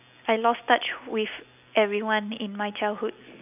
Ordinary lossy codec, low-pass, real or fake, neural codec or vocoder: none; 3.6 kHz; real; none